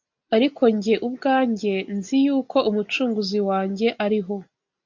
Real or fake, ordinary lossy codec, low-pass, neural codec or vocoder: real; MP3, 64 kbps; 7.2 kHz; none